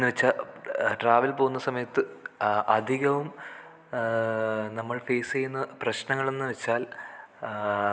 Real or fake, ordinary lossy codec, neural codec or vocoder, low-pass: real; none; none; none